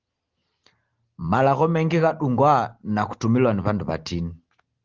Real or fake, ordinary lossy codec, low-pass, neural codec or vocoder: real; Opus, 16 kbps; 7.2 kHz; none